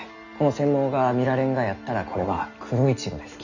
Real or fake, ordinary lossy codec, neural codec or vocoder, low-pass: real; none; none; 7.2 kHz